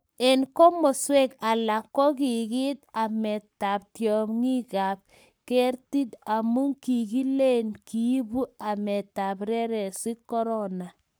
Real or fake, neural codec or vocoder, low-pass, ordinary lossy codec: fake; codec, 44.1 kHz, 7.8 kbps, Pupu-Codec; none; none